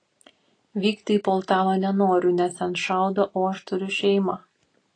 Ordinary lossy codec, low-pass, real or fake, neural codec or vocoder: AAC, 32 kbps; 9.9 kHz; real; none